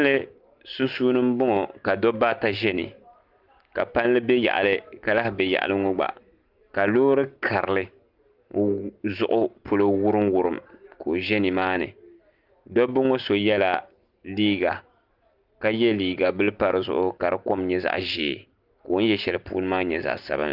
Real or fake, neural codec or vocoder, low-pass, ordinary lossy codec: real; none; 5.4 kHz; Opus, 24 kbps